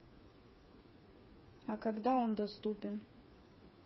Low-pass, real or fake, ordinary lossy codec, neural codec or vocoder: 7.2 kHz; fake; MP3, 24 kbps; codec, 16 kHz, 4 kbps, FreqCodec, smaller model